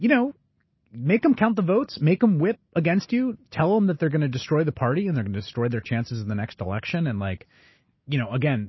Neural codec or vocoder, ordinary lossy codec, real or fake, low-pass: none; MP3, 24 kbps; real; 7.2 kHz